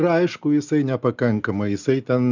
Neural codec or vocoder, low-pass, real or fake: none; 7.2 kHz; real